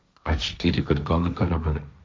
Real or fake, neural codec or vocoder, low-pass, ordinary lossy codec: fake; codec, 16 kHz, 1.1 kbps, Voila-Tokenizer; none; none